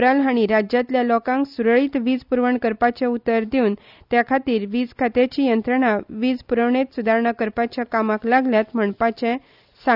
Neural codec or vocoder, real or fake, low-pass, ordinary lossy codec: none; real; 5.4 kHz; none